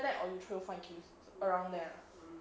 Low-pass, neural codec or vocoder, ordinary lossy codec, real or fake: none; none; none; real